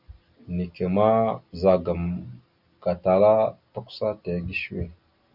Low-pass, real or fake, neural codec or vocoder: 5.4 kHz; real; none